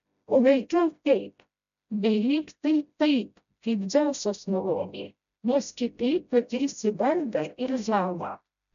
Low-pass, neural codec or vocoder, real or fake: 7.2 kHz; codec, 16 kHz, 0.5 kbps, FreqCodec, smaller model; fake